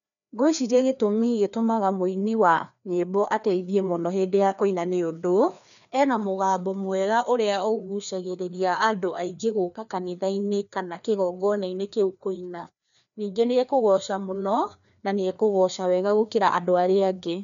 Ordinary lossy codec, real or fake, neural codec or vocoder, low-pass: none; fake; codec, 16 kHz, 2 kbps, FreqCodec, larger model; 7.2 kHz